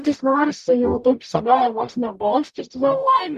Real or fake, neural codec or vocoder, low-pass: fake; codec, 44.1 kHz, 0.9 kbps, DAC; 14.4 kHz